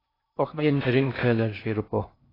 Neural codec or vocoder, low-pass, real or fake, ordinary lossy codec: codec, 16 kHz in and 24 kHz out, 0.6 kbps, FocalCodec, streaming, 2048 codes; 5.4 kHz; fake; AAC, 24 kbps